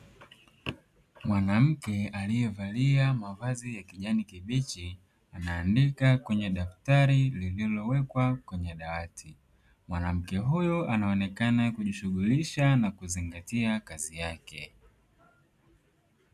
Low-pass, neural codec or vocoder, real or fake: 14.4 kHz; none; real